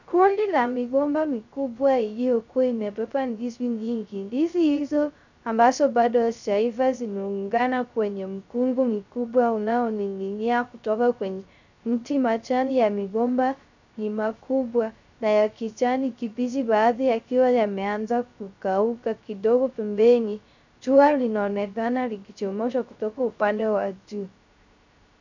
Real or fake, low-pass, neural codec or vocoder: fake; 7.2 kHz; codec, 16 kHz, 0.3 kbps, FocalCodec